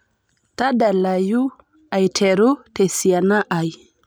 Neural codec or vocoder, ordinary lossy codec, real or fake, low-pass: none; none; real; none